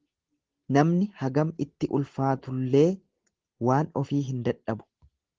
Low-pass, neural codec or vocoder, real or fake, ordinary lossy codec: 7.2 kHz; none; real; Opus, 16 kbps